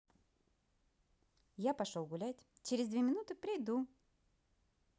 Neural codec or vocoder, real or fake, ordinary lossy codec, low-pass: none; real; none; none